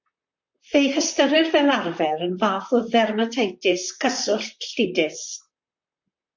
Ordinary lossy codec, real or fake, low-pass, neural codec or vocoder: MP3, 64 kbps; fake; 7.2 kHz; vocoder, 44.1 kHz, 128 mel bands, Pupu-Vocoder